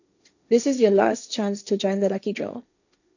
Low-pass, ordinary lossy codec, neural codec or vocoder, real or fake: 7.2 kHz; none; codec, 16 kHz, 1.1 kbps, Voila-Tokenizer; fake